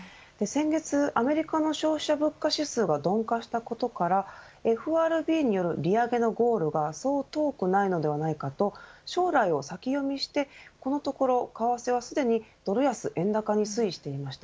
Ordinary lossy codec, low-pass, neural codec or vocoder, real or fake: none; none; none; real